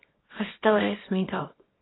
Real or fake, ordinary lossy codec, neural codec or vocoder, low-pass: fake; AAC, 16 kbps; codec, 24 kHz, 0.9 kbps, WavTokenizer, small release; 7.2 kHz